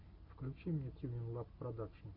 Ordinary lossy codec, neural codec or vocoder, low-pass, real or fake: AAC, 48 kbps; none; 5.4 kHz; real